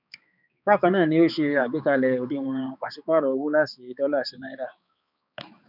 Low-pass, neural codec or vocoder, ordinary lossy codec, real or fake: 5.4 kHz; codec, 16 kHz, 4 kbps, X-Codec, HuBERT features, trained on balanced general audio; none; fake